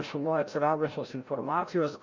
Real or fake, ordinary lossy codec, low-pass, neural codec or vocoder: fake; MP3, 64 kbps; 7.2 kHz; codec, 16 kHz, 0.5 kbps, FreqCodec, larger model